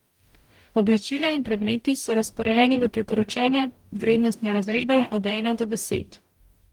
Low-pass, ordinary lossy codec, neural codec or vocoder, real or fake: 19.8 kHz; Opus, 32 kbps; codec, 44.1 kHz, 0.9 kbps, DAC; fake